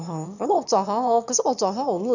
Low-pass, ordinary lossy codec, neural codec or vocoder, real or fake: 7.2 kHz; none; autoencoder, 22.05 kHz, a latent of 192 numbers a frame, VITS, trained on one speaker; fake